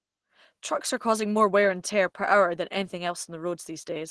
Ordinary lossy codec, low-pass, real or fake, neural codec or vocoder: Opus, 16 kbps; 10.8 kHz; real; none